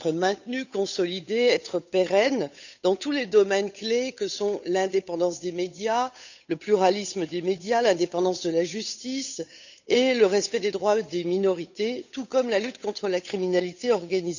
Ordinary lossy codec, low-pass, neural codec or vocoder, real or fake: none; 7.2 kHz; codec, 16 kHz, 8 kbps, FunCodec, trained on Chinese and English, 25 frames a second; fake